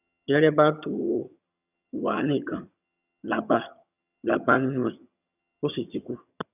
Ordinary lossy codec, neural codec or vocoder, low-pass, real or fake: none; vocoder, 22.05 kHz, 80 mel bands, HiFi-GAN; 3.6 kHz; fake